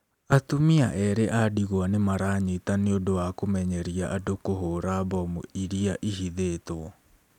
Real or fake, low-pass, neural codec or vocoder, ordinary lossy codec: real; 19.8 kHz; none; none